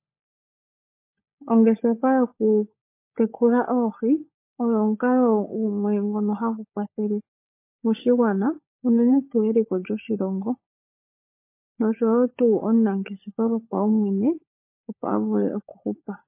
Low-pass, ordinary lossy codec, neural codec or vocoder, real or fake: 3.6 kHz; MP3, 24 kbps; codec, 16 kHz, 16 kbps, FunCodec, trained on LibriTTS, 50 frames a second; fake